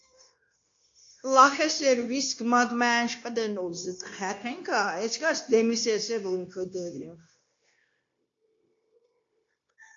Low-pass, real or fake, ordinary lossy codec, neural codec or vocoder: 7.2 kHz; fake; AAC, 48 kbps; codec, 16 kHz, 0.9 kbps, LongCat-Audio-Codec